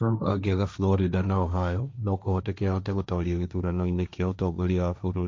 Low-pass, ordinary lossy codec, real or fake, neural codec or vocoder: none; none; fake; codec, 16 kHz, 1.1 kbps, Voila-Tokenizer